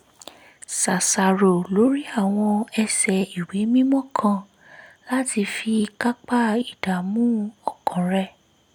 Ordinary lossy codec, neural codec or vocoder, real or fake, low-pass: none; none; real; 19.8 kHz